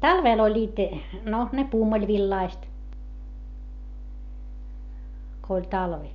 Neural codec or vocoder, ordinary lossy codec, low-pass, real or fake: none; none; 7.2 kHz; real